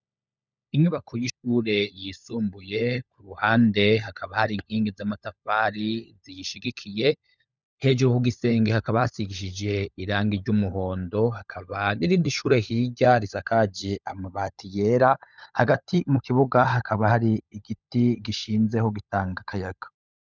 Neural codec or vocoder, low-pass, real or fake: codec, 16 kHz, 16 kbps, FunCodec, trained on LibriTTS, 50 frames a second; 7.2 kHz; fake